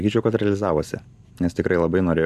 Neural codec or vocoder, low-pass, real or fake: none; 14.4 kHz; real